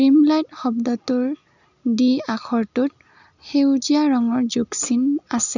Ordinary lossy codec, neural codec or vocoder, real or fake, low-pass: none; none; real; 7.2 kHz